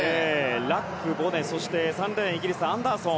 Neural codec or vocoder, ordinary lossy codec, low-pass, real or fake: none; none; none; real